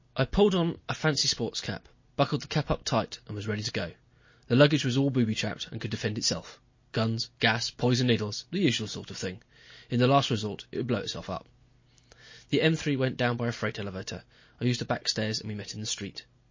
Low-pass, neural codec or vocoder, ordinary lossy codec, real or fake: 7.2 kHz; none; MP3, 32 kbps; real